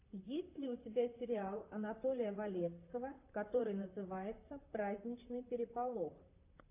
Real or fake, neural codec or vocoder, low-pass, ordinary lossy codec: fake; vocoder, 44.1 kHz, 128 mel bands, Pupu-Vocoder; 3.6 kHz; AAC, 32 kbps